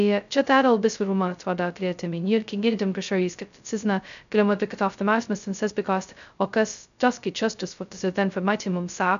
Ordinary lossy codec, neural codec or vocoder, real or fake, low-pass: MP3, 96 kbps; codec, 16 kHz, 0.2 kbps, FocalCodec; fake; 7.2 kHz